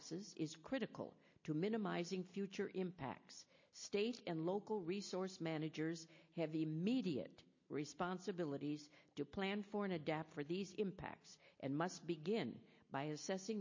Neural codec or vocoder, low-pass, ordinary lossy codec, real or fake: none; 7.2 kHz; MP3, 32 kbps; real